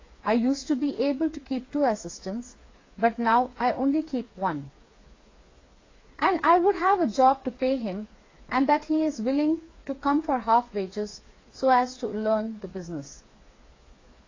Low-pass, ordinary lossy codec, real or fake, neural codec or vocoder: 7.2 kHz; AAC, 32 kbps; fake; codec, 16 kHz, 4 kbps, FreqCodec, smaller model